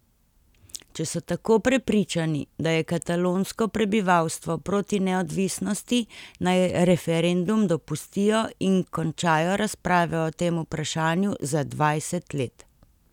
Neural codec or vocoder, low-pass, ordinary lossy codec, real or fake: none; 19.8 kHz; none; real